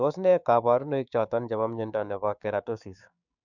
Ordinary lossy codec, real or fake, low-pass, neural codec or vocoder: none; fake; 7.2 kHz; codec, 16 kHz, 6 kbps, DAC